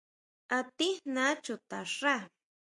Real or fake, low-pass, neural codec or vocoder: real; 10.8 kHz; none